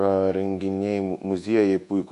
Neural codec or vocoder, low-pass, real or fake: codec, 24 kHz, 1.2 kbps, DualCodec; 10.8 kHz; fake